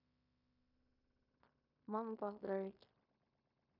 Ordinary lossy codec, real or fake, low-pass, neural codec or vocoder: none; fake; 5.4 kHz; codec, 16 kHz in and 24 kHz out, 0.9 kbps, LongCat-Audio-Codec, fine tuned four codebook decoder